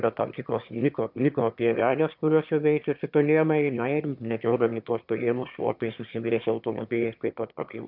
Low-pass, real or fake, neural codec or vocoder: 5.4 kHz; fake; autoencoder, 22.05 kHz, a latent of 192 numbers a frame, VITS, trained on one speaker